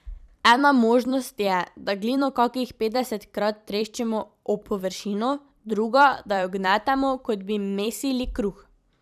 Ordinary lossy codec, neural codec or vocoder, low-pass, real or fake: none; vocoder, 44.1 kHz, 128 mel bands every 256 samples, BigVGAN v2; 14.4 kHz; fake